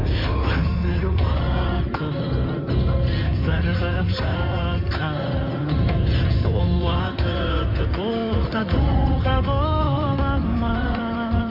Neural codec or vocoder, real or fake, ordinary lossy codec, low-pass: codec, 16 kHz in and 24 kHz out, 2.2 kbps, FireRedTTS-2 codec; fake; MP3, 48 kbps; 5.4 kHz